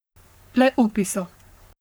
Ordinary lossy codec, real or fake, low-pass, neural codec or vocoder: none; fake; none; codec, 44.1 kHz, 3.4 kbps, Pupu-Codec